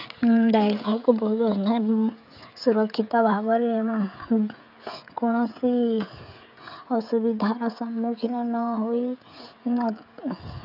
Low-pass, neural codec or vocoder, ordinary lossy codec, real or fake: 5.4 kHz; codec, 16 kHz, 16 kbps, FreqCodec, smaller model; none; fake